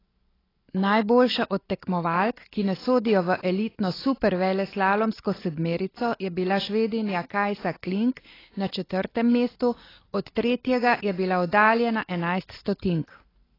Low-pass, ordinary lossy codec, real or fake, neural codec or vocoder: 5.4 kHz; AAC, 24 kbps; real; none